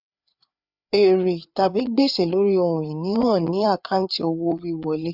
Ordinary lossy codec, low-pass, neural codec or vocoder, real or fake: none; 5.4 kHz; codec, 16 kHz, 4 kbps, FreqCodec, larger model; fake